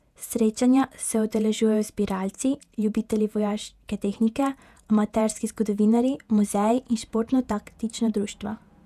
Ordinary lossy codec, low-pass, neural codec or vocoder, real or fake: none; 14.4 kHz; vocoder, 48 kHz, 128 mel bands, Vocos; fake